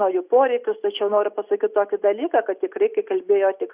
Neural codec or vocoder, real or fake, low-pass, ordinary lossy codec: none; real; 3.6 kHz; Opus, 64 kbps